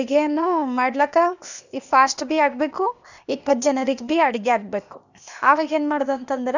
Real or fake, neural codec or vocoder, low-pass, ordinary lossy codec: fake; codec, 16 kHz, 0.8 kbps, ZipCodec; 7.2 kHz; none